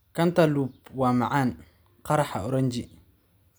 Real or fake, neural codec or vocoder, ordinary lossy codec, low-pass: real; none; none; none